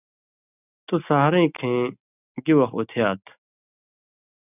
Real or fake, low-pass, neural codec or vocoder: real; 3.6 kHz; none